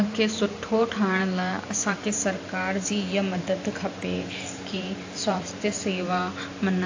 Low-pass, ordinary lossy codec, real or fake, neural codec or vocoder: 7.2 kHz; AAC, 48 kbps; fake; vocoder, 44.1 kHz, 128 mel bands every 512 samples, BigVGAN v2